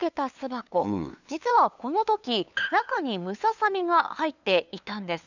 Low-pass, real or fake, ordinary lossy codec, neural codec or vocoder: 7.2 kHz; fake; none; codec, 16 kHz, 2 kbps, FunCodec, trained on LibriTTS, 25 frames a second